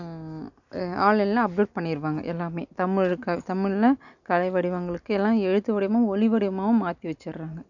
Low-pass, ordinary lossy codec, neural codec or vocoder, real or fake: 7.2 kHz; none; none; real